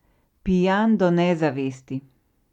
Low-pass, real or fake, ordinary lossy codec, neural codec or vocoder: 19.8 kHz; real; none; none